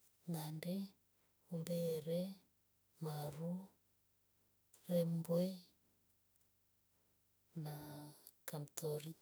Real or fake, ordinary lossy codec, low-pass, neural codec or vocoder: fake; none; none; autoencoder, 48 kHz, 32 numbers a frame, DAC-VAE, trained on Japanese speech